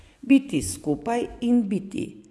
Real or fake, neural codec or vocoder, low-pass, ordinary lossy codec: fake; vocoder, 24 kHz, 100 mel bands, Vocos; none; none